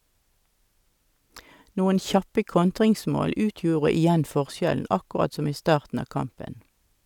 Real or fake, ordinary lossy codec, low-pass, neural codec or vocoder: real; none; 19.8 kHz; none